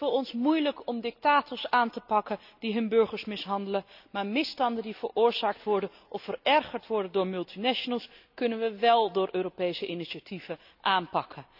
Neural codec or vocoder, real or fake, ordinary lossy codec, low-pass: none; real; none; 5.4 kHz